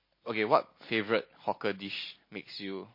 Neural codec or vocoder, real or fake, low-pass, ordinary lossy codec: none; real; 5.4 kHz; MP3, 24 kbps